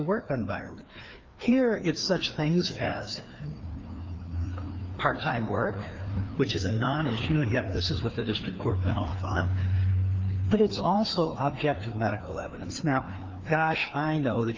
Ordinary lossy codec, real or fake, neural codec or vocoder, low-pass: Opus, 32 kbps; fake; codec, 16 kHz, 2 kbps, FreqCodec, larger model; 7.2 kHz